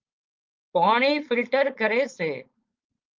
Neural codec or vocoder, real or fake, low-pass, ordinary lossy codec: codec, 16 kHz, 4.8 kbps, FACodec; fake; 7.2 kHz; Opus, 32 kbps